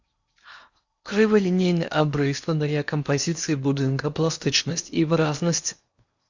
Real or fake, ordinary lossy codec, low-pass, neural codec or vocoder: fake; Opus, 64 kbps; 7.2 kHz; codec, 16 kHz in and 24 kHz out, 0.8 kbps, FocalCodec, streaming, 65536 codes